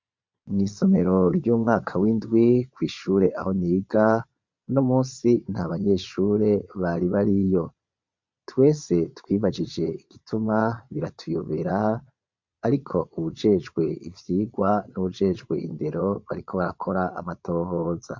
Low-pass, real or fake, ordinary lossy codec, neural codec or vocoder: 7.2 kHz; fake; MP3, 64 kbps; vocoder, 22.05 kHz, 80 mel bands, Vocos